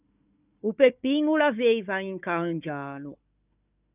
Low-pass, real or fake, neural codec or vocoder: 3.6 kHz; fake; codec, 16 kHz, 4 kbps, FunCodec, trained on Chinese and English, 50 frames a second